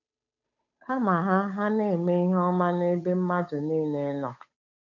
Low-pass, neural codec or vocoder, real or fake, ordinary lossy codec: 7.2 kHz; codec, 16 kHz, 8 kbps, FunCodec, trained on Chinese and English, 25 frames a second; fake; none